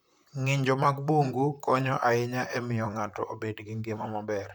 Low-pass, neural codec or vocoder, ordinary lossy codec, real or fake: none; vocoder, 44.1 kHz, 128 mel bands, Pupu-Vocoder; none; fake